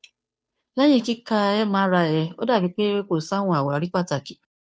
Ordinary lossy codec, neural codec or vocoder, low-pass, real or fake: none; codec, 16 kHz, 2 kbps, FunCodec, trained on Chinese and English, 25 frames a second; none; fake